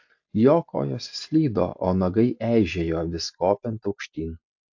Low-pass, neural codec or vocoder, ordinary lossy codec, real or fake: 7.2 kHz; none; AAC, 48 kbps; real